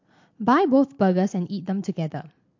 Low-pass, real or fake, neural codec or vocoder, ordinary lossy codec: 7.2 kHz; real; none; MP3, 48 kbps